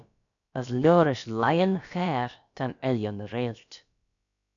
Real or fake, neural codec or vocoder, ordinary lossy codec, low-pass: fake; codec, 16 kHz, about 1 kbps, DyCAST, with the encoder's durations; MP3, 96 kbps; 7.2 kHz